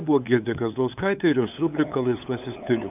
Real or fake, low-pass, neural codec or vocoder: fake; 3.6 kHz; codec, 16 kHz, 8 kbps, FunCodec, trained on LibriTTS, 25 frames a second